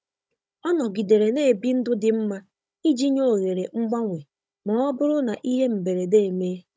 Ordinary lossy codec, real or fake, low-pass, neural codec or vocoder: none; fake; none; codec, 16 kHz, 16 kbps, FunCodec, trained on Chinese and English, 50 frames a second